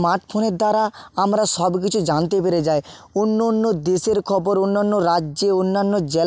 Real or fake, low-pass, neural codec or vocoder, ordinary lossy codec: real; none; none; none